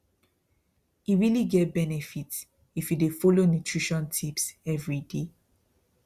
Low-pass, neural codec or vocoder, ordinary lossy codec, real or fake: 14.4 kHz; none; Opus, 64 kbps; real